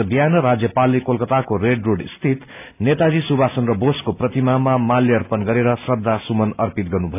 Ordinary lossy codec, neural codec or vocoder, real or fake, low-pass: AAC, 32 kbps; none; real; 3.6 kHz